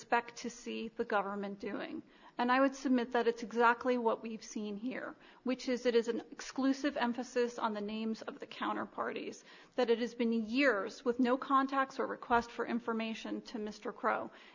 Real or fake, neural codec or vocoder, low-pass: real; none; 7.2 kHz